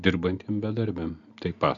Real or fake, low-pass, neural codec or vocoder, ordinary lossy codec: real; 7.2 kHz; none; MP3, 96 kbps